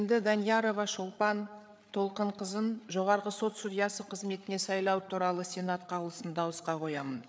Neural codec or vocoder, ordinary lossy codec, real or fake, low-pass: codec, 16 kHz, 8 kbps, FreqCodec, larger model; none; fake; none